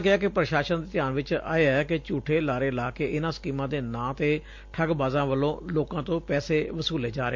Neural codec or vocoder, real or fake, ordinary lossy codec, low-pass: none; real; MP3, 48 kbps; 7.2 kHz